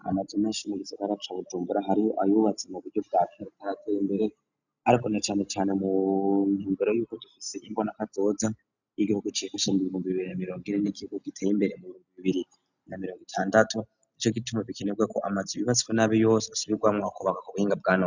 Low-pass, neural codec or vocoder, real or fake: 7.2 kHz; none; real